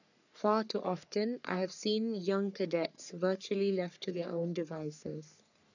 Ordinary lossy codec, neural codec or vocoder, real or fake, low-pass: none; codec, 44.1 kHz, 3.4 kbps, Pupu-Codec; fake; 7.2 kHz